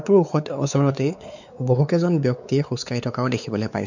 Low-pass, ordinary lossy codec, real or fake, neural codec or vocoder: 7.2 kHz; none; fake; codec, 16 kHz, 4 kbps, X-Codec, WavLM features, trained on Multilingual LibriSpeech